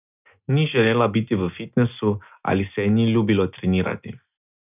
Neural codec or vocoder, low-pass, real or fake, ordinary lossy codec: none; 3.6 kHz; real; none